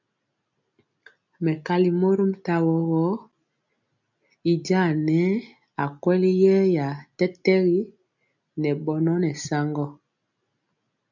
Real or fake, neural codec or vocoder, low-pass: real; none; 7.2 kHz